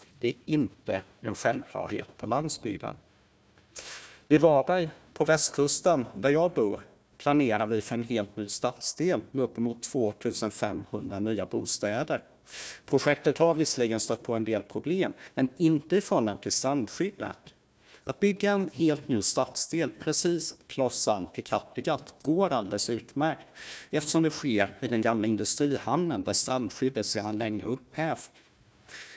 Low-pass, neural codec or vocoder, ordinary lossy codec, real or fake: none; codec, 16 kHz, 1 kbps, FunCodec, trained on Chinese and English, 50 frames a second; none; fake